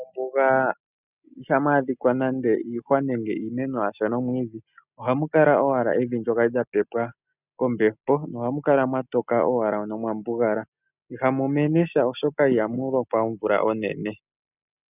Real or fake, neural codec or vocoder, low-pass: real; none; 3.6 kHz